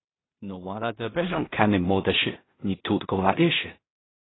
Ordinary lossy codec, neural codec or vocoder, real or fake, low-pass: AAC, 16 kbps; codec, 16 kHz in and 24 kHz out, 0.4 kbps, LongCat-Audio-Codec, two codebook decoder; fake; 7.2 kHz